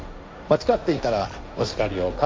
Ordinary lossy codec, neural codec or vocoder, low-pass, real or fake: none; codec, 16 kHz, 1.1 kbps, Voila-Tokenizer; none; fake